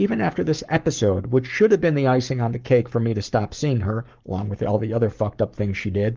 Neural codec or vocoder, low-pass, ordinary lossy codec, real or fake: codec, 44.1 kHz, 7.8 kbps, Pupu-Codec; 7.2 kHz; Opus, 32 kbps; fake